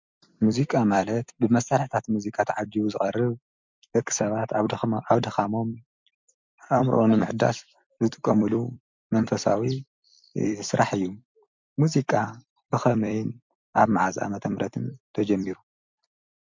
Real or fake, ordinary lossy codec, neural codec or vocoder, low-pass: fake; MP3, 64 kbps; vocoder, 44.1 kHz, 128 mel bands every 256 samples, BigVGAN v2; 7.2 kHz